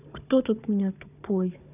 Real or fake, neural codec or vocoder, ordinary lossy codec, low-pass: fake; codec, 16 kHz, 4 kbps, FunCodec, trained on Chinese and English, 50 frames a second; none; 3.6 kHz